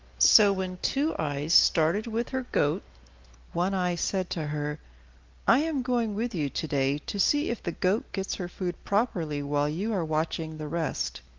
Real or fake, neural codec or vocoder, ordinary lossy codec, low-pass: real; none; Opus, 32 kbps; 7.2 kHz